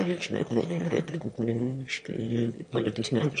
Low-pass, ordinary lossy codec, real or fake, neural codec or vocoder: 9.9 kHz; MP3, 48 kbps; fake; autoencoder, 22.05 kHz, a latent of 192 numbers a frame, VITS, trained on one speaker